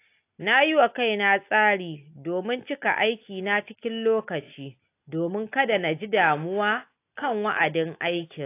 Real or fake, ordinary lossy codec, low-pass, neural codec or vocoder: real; AAC, 24 kbps; 3.6 kHz; none